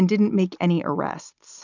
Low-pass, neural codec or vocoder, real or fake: 7.2 kHz; none; real